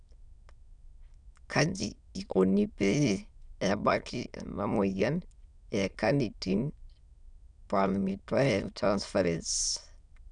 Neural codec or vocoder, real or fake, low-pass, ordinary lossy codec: autoencoder, 22.05 kHz, a latent of 192 numbers a frame, VITS, trained on many speakers; fake; 9.9 kHz; none